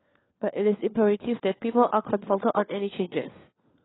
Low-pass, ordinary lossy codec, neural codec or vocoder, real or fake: 7.2 kHz; AAC, 16 kbps; codec, 16 kHz, 4 kbps, FunCodec, trained on LibriTTS, 50 frames a second; fake